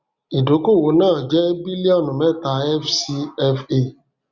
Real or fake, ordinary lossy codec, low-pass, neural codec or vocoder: real; Opus, 64 kbps; 7.2 kHz; none